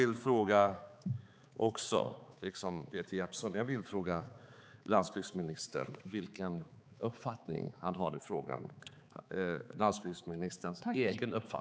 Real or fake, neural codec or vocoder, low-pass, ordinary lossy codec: fake; codec, 16 kHz, 4 kbps, X-Codec, HuBERT features, trained on balanced general audio; none; none